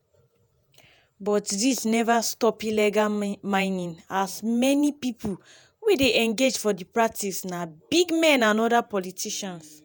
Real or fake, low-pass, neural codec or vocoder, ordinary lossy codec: fake; none; vocoder, 48 kHz, 128 mel bands, Vocos; none